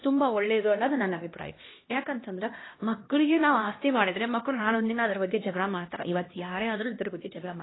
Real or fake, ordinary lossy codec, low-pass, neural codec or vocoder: fake; AAC, 16 kbps; 7.2 kHz; codec, 16 kHz, 1 kbps, X-Codec, HuBERT features, trained on LibriSpeech